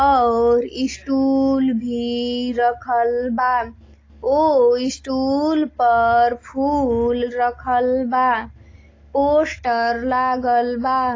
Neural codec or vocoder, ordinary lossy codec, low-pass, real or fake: none; AAC, 32 kbps; 7.2 kHz; real